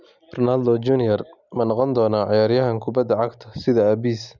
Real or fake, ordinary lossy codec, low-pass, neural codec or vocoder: real; none; 7.2 kHz; none